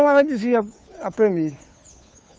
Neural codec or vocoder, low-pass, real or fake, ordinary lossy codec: codec, 16 kHz, 2 kbps, FunCodec, trained on Chinese and English, 25 frames a second; none; fake; none